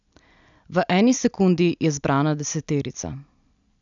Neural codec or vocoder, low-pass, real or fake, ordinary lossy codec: none; 7.2 kHz; real; none